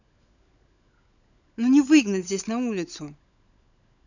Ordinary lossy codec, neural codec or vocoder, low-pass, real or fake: none; codec, 16 kHz, 16 kbps, FunCodec, trained on LibriTTS, 50 frames a second; 7.2 kHz; fake